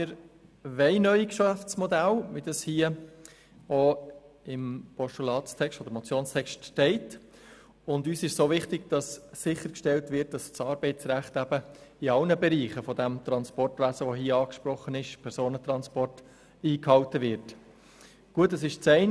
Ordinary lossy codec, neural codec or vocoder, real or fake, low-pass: none; none; real; none